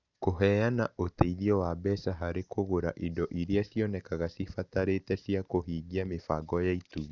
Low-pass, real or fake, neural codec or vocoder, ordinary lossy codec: 7.2 kHz; real; none; none